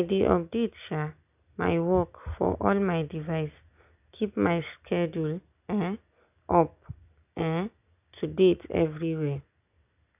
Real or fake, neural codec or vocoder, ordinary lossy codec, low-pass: real; none; none; 3.6 kHz